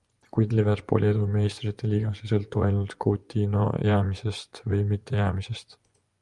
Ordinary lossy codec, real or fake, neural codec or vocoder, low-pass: Opus, 32 kbps; fake; vocoder, 24 kHz, 100 mel bands, Vocos; 10.8 kHz